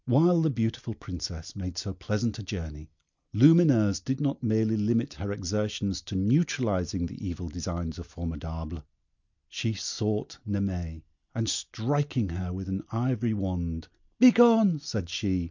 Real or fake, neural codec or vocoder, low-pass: real; none; 7.2 kHz